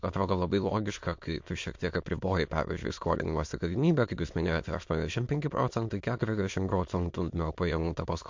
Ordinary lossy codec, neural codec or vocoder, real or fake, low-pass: MP3, 48 kbps; autoencoder, 22.05 kHz, a latent of 192 numbers a frame, VITS, trained on many speakers; fake; 7.2 kHz